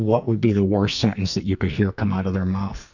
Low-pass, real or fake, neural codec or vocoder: 7.2 kHz; fake; codec, 44.1 kHz, 2.6 kbps, SNAC